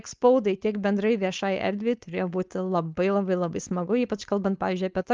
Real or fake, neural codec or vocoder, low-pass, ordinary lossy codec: fake; codec, 16 kHz, 4.8 kbps, FACodec; 7.2 kHz; Opus, 32 kbps